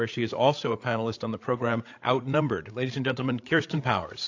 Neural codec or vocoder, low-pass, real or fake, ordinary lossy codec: codec, 16 kHz, 8 kbps, FreqCodec, larger model; 7.2 kHz; fake; AAC, 48 kbps